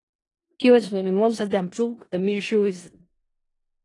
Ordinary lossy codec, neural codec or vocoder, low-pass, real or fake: AAC, 32 kbps; codec, 16 kHz in and 24 kHz out, 0.4 kbps, LongCat-Audio-Codec, four codebook decoder; 10.8 kHz; fake